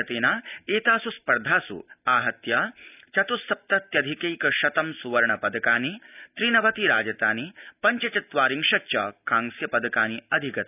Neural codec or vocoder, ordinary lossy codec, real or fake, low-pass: none; none; real; 3.6 kHz